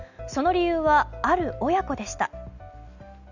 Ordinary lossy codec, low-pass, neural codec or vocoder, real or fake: none; 7.2 kHz; none; real